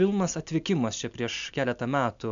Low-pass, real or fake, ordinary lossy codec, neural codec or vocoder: 7.2 kHz; real; MP3, 64 kbps; none